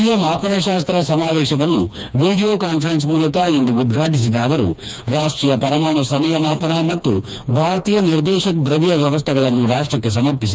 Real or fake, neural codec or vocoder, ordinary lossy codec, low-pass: fake; codec, 16 kHz, 2 kbps, FreqCodec, smaller model; none; none